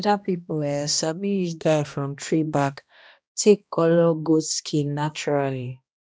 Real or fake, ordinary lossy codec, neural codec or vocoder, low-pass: fake; none; codec, 16 kHz, 1 kbps, X-Codec, HuBERT features, trained on balanced general audio; none